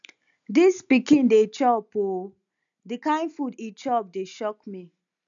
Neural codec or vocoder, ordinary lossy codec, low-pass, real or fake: none; none; 7.2 kHz; real